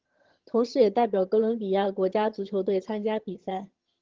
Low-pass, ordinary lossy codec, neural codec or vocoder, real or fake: 7.2 kHz; Opus, 16 kbps; vocoder, 22.05 kHz, 80 mel bands, HiFi-GAN; fake